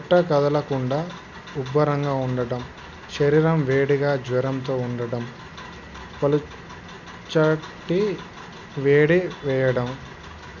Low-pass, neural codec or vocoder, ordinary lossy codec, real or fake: 7.2 kHz; none; none; real